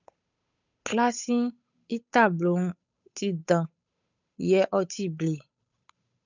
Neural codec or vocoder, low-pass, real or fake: codec, 16 kHz, 8 kbps, FunCodec, trained on Chinese and English, 25 frames a second; 7.2 kHz; fake